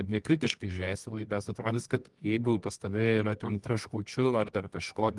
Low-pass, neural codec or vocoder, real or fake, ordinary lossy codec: 10.8 kHz; codec, 24 kHz, 0.9 kbps, WavTokenizer, medium music audio release; fake; Opus, 24 kbps